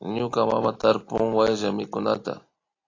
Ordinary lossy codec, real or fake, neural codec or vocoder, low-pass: AAC, 32 kbps; real; none; 7.2 kHz